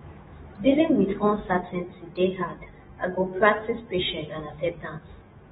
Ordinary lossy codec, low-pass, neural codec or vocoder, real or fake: AAC, 16 kbps; 19.8 kHz; autoencoder, 48 kHz, 128 numbers a frame, DAC-VAE, trained on Japanese speech; fake